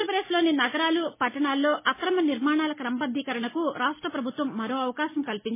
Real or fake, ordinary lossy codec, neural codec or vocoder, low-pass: real; MP3, 16 kbps; none; 3.6 kHz